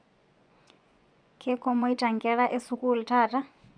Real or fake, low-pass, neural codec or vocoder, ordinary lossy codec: fake; 9.9 kHz; vocoder, 24 kHz, 100 mel bands, Vocos; none